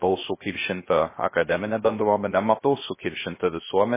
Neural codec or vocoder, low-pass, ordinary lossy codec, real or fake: codec, 16 kHz, 0.3 kbps, FocalCodec; 3.6 kHz; MP3, 16 kbps; fake